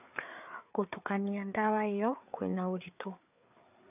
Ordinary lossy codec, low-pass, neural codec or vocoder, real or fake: none; 3.6 kHz; codec, 16 kHz, 4 kbps, FreqCodec, larger model; fake